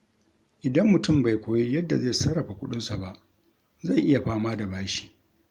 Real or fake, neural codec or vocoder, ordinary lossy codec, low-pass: real; none; Opus, 32 kbps; 19.8 kHz